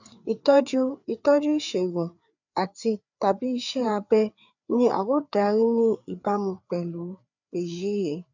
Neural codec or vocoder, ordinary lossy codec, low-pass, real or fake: codec, 16 kHz, 4 kbps, FreqCodec, larger model; none; 7.2 kHz; fake